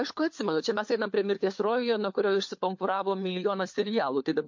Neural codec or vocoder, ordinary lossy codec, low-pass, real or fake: codec, 16 kHz, 4 kbps, FunCodec, trained on LibriTTS, 50 frames a second; MP3, 48 kbps; 7.2 kHz; fake